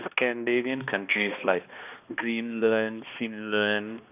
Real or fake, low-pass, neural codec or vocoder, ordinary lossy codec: fake; 3.6 kHz; codec, 16 kHz, 1 kbps, X-Codec, HuBERT features, trained on balanced general audio; none